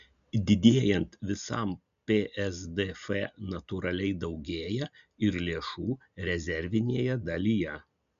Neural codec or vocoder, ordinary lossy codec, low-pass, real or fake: none; MP3, 96 kbps; 7.2 kHz; real